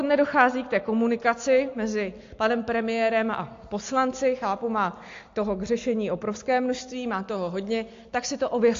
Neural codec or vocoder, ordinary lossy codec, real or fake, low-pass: none; AAC, 48 kbps; real; 7.2 kHz